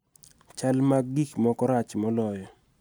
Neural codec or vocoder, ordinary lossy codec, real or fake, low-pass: none; none; real; none